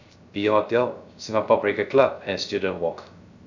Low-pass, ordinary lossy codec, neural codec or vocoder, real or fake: 7.2 kHz; none; codec, 16 kHz, 0.3 kbps, FocalCodec; fake